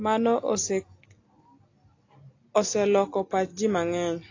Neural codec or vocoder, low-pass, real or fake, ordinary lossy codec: none; 7.2 kHz; real; AAC, 32 kbps